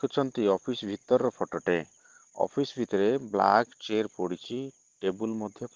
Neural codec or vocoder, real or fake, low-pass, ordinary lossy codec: none; real; 7.2 kHz; Opus, 16 kbps